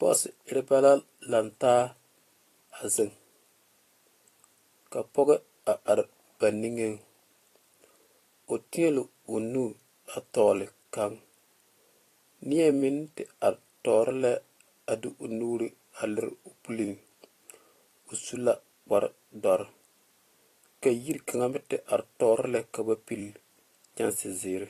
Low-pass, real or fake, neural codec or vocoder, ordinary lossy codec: 14.4 kHz; fake; vocoder, 44.1 kHz, 128 mel bands every 256 samples, BigVGAN v2; AAC, 48 kbps